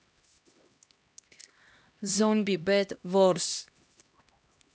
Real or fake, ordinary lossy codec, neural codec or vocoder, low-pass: fake; none; codec, 16 kHz, 1 kbps, X-Codec, HuBERT features, trained on LibriSpeech; none